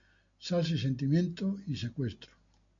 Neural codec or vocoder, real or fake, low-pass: none; real; 7.2 kHz